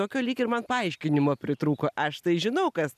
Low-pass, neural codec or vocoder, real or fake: 14.4 kHz; none; real